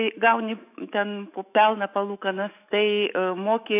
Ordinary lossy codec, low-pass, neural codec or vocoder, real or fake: AAC, 32 kbps; 3.6 kHz; autoencoder, 48 kHz, 128 numbers a frame, DAC-VAE, trained on Japanese speech; fake